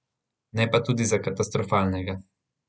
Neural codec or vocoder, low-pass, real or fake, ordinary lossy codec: none; none; real; none